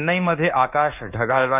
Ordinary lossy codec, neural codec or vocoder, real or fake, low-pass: AAC, 24 kbps; codec, 24 kHz, 1.2 kbps, DualCodec; fake; 3.6 kHz